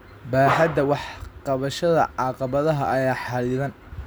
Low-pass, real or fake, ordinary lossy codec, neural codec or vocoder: none; real; none; none